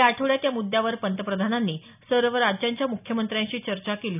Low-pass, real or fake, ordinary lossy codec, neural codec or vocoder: 3.6 kHz; real; none; none